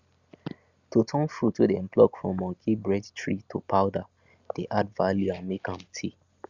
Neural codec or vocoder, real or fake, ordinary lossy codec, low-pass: none; real; Opus, 64 kbps; 7.2 kHz